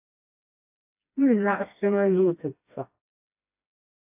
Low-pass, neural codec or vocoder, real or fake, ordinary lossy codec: 3.6 kHz; codec, 16 kHz, 1 kbps, FreqCodec, smaller model; fake; AAC, 32 kbps